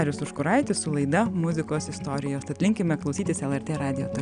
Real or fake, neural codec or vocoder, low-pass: real; none; 9.9 kHz